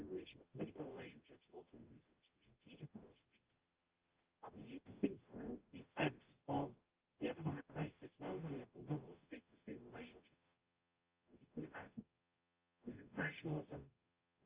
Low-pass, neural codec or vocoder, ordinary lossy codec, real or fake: 3.6 kHz; codec, 44.1 kHz, 0.9 kbps, DAC; Opus, 32 kbps; fake